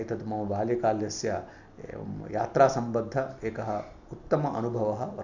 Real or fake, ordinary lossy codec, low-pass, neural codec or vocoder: real; Opus, 64 kbps; 7.2 kHz; none